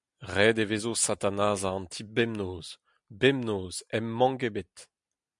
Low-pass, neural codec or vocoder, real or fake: 10.8 kHz; none; real